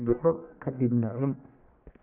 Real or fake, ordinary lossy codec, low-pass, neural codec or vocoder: fake; none; 3.6 kHz; codec, 44.1 kHz, 1.7 kbps, Pupu-Codec